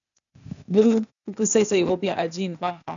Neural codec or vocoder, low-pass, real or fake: codec, 16 kHz, 0.8 kbps, ZipCodec; 7.2 kHz; fake